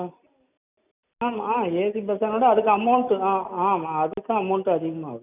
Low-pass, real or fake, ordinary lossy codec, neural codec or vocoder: 3.6 kHz; real; none; none